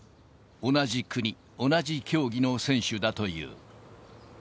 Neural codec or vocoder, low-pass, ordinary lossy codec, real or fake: none; none; none; real